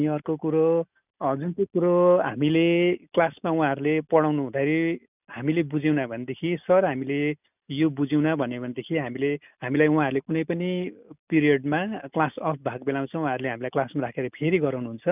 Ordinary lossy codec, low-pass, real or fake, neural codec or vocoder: none; 3.6 kHz; real; none